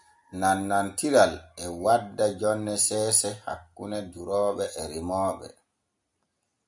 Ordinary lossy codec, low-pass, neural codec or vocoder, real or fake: MP3, 96 kbps; 10.8 kHz; none; real